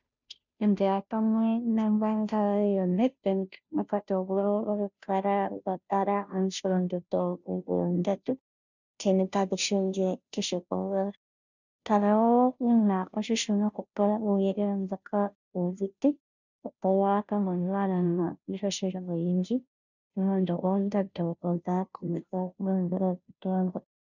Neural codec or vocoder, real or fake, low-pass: codec, 16 kHz, 0.5 kbps, FunCodec, trained on Chinese and English, 25 frames a second; fake; 7.2 kHz